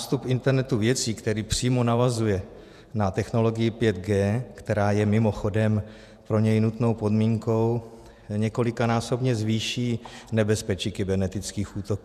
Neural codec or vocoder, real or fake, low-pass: vocoder, 48 kHz, 128 mel bands, Vocos; fake; 14.4 kHz